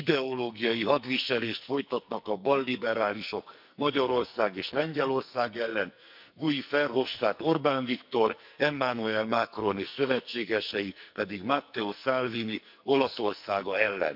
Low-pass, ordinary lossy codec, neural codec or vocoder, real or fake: 5.4 kHz; none; codec, 44.1 kHz, 2.6 kbps, SNAC; fake